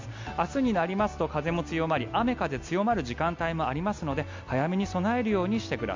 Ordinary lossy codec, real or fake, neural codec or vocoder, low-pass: MP3, 64 kbps; real; none; 7.2 kHz